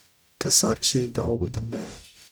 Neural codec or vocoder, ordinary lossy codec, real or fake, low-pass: codec, 44.1 kHz, 0.9 kbps, DAC; none; fake; none